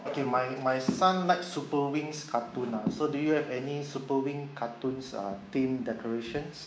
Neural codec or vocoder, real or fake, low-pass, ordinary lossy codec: codec, 16 kHz, 6 kbps, DAC; fake; none; none